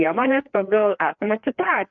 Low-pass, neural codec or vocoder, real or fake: 7.2 kHz; codec, 16 kHz, 2 kbps, FreqCodec, larger model; fake